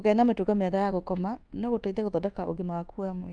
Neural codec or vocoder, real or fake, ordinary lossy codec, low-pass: codec, 24 kHz, 1.2 kbps, DualCodec; fake; Opus, 32 kbps; 9.9 kHz